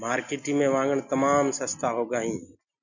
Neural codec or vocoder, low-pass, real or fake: none; 7.2 kHz; real